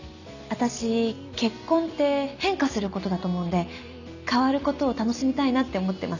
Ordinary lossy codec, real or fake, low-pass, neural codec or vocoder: none; real; 7.2 kHz; none